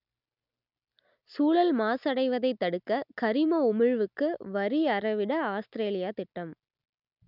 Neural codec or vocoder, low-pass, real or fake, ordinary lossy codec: none; 5.4 kHz; real; none